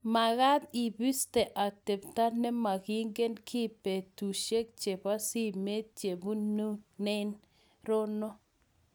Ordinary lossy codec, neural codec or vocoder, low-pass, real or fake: none; none; none; real